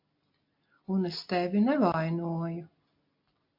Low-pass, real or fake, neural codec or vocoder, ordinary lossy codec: 5.4 kHz; real; none; AAC, 48 kbps